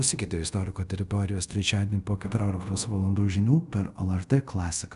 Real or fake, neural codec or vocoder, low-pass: fake; codec, 24 kHz, 0.5 kbps, DualCodec; 10.8 kHz